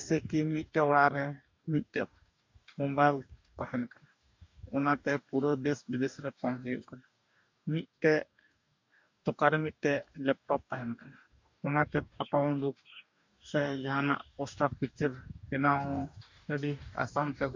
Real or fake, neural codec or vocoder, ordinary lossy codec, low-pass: fake; codec, 44.1 kHz, 2.6 kbps, DAC; MP3, 64 kbps; 7.2 kHz